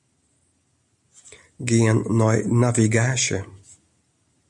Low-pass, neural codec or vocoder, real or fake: 10.8 kHz; none; real